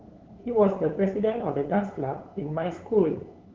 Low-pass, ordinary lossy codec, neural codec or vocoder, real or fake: 7.2 kHz; Opus, 16 kbps; codec, 16 kHz, 8 kbps, FunCodec, trained on LibriTTS, 25 frames a second; fake